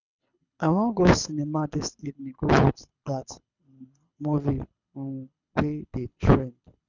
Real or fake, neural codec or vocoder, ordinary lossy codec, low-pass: fake; codec, 24 kHz, 6 kbps, HILCodec; none; 7.2 kHz